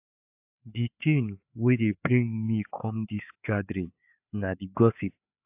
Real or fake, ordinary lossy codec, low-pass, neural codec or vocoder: fake; AAC, 32 kbps; 3.6 kHz; codec, 16 kHz, 4 kbps, FreqCodec, larger model